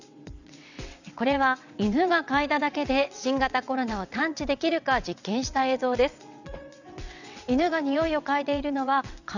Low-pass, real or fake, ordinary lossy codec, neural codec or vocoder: 7.2 kHz; fake; none; vocoder, 22.05 kHz, 80 mel bands, WaveNeXt